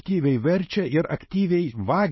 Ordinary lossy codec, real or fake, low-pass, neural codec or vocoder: MP3, 24 kbps; real; 7.2 kHz; none